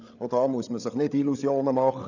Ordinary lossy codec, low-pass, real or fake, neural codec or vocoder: none; 7.2 kHz; fake; codec, 16 kHz, 8 kbps, FreqCodec, larger model